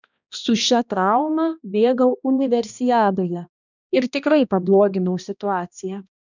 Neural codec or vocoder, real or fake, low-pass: codec, 16 kHz, 1 kbps, X-Codec, HuBERT features, trained on balanced general audio; fake; 7.2 kHz